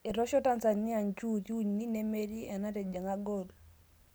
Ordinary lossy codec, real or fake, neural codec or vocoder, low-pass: none; real; none; none